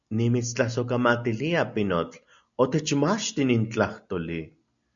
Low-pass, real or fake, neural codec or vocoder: 7.2 kHz; real; none